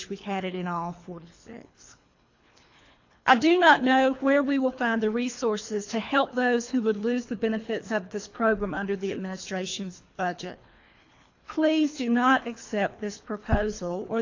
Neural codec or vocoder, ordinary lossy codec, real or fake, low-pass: codec, 24 kHz, 3 kbps, HILCodec; AAC, 48 kbps; fake; 7.2 kHz